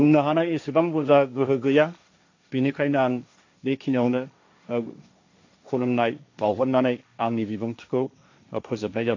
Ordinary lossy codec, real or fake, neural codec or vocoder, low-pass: AAC, 48 kbps; fake; codec, 16 kHz, 1.1 kbps, Voila-Tokenizer; 7.2 kHz